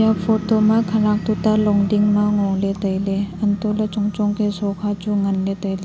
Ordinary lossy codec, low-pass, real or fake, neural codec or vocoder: none; none; real; none